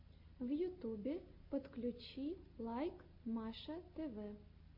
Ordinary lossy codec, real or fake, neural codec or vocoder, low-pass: MP3, 32 kbps; real; none; 5.4 kHz